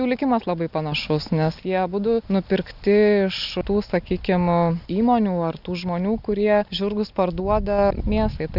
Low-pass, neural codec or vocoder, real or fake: 5.4 kHz; none; real